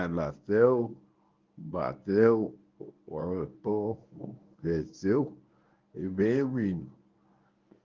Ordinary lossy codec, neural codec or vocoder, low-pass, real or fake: Opus, 32 kbps; codec, 24 kHz, 0.9 kbps, WavTokenizer, medium speech release version 1; 7.2 kHz; fake